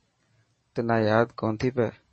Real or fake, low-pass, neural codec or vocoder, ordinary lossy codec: real; 10.8 kHz; none; MP3, 32 kbps